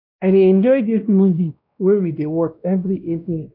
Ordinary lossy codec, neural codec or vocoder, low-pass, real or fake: none; codec, 16 kHz, 1 kbps, X-Codec, WavLM features, trained on Multilingual LibriSpeech; 5.4 kHz; fake